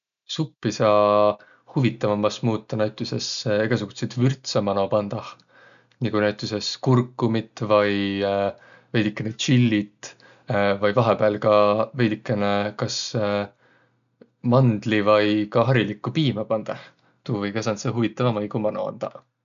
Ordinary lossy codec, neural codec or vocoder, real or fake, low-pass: none; none; real; 7.2 kHz